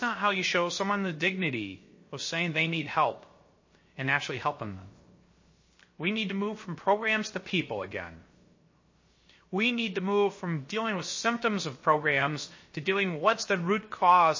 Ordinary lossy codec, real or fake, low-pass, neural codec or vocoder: MP3, 32 kbps; fake; 7.2 kHz; codec, 16 kHz, 0.3 kbps, FocalCodec